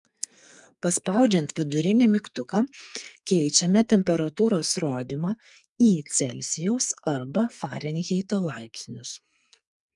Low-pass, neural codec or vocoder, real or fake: 10.8 kHz; codec, 44.1 kHz, 2.6 kbps, SNAC; fake